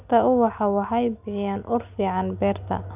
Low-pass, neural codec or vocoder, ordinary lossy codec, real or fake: 3.6 kHz; none; none; real